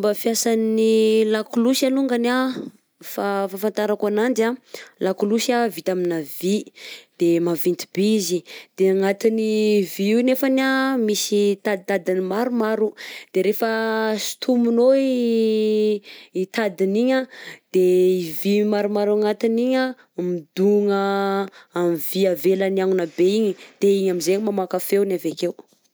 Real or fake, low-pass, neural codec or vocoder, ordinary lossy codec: real; none; none; none